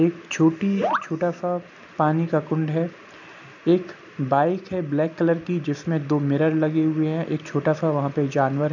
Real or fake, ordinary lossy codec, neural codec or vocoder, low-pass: real; none; none; 7.2 kHz